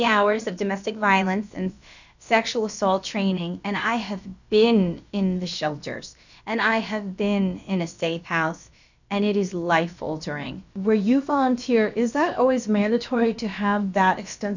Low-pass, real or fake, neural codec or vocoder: 7.2 kHz; fake; codec, 16 kHz, about 1 kbps, DyCAST, with the encoder's durations